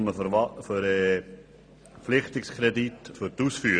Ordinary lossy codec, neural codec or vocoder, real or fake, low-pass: none; none; real; 9.9 kHz